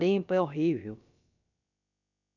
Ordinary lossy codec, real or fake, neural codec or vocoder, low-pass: none; fake; codec, 16 kHz, about 1 kbps, DyCAST, with the encoder's durations; 7.2 kHz